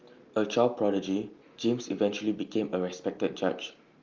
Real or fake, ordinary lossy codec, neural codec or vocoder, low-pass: real; Opus, 24 kbps; none; 7.2 kHz